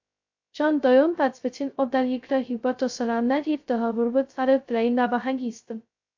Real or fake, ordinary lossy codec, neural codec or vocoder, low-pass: fake; AAC, 48 kbps; codec, 16 kHz, 0.2 kbps, FocalCodec; 7.2 kHz